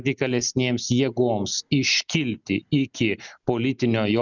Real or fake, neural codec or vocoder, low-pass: real; none; 7.2 kHz